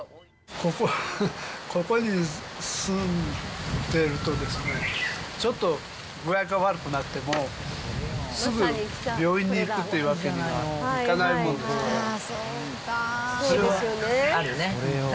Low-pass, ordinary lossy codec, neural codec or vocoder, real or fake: none; none; none; real